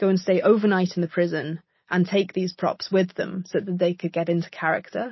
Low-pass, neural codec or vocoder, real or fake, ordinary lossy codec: 7.2 kHz; none; real; MP3, 24 kbps